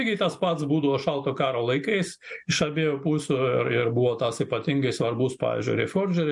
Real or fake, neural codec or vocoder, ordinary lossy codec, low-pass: real; none; MP3, 64 kbps; 10.8 kHz